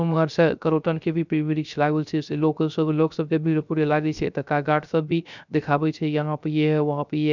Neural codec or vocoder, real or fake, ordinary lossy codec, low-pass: codec, 16 kHz, 0.3 kbps, FocalCodec; fake; none; 7.2 kHz